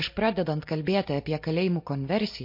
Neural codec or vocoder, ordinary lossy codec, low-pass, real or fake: none; MP3, 32 kbps; 5.4 kHz; real